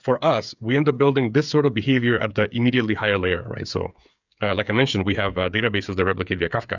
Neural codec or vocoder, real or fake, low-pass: codec, 16 kHz, 8 kbps, FreqCodec, smaller model; fake; 7.2 kHz